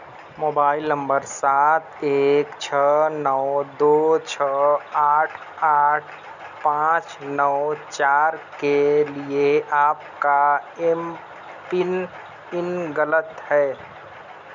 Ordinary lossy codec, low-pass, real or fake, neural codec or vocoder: none; 7.2 kHz; real; none